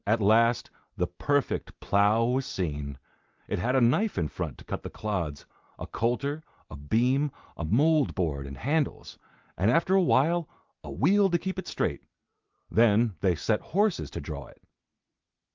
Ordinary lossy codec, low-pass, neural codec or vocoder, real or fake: Opus, 32 kbps; 7.2 kHz; none; real